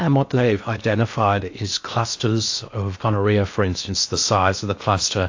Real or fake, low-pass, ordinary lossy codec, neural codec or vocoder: fake; 7.2 kHz; AAC, 48 kbps; codec, 16 kHz in and 24 kHz out, 0.8 kbps, FocalCodec, streaming, 65536 codes